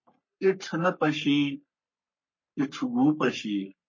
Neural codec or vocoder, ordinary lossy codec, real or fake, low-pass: codec, 44.1 kHz, 3.4 kbps, Pupu-Codec; MP3, 32 kbps; fake; 7.2 kHz